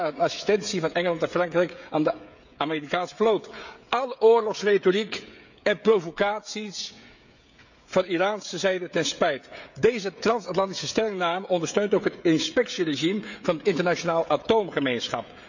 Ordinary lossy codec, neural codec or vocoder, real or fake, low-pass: none; codec, 16 kHz, 16 kbps, FreqCodec, smaller model; fake; 7.2 kHz